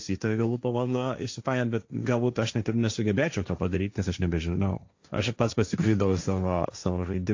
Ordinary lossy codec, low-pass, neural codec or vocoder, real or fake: AAC, 48 kbps; 7.2 kHz; codec, 16 kHz, 1.1 kbps, Voila-Tokenizer; fake